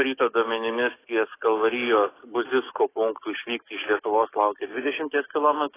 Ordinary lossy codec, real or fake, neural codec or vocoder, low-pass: AAC, 16 kbps; fake; codec, 44.1 kHz, 7.8 kbps, DAC; 3.6 kHz